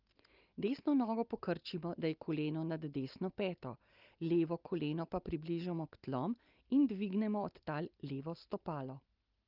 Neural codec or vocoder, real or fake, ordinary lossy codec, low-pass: none; real; Opus, 24 kbps; 5.4 kHz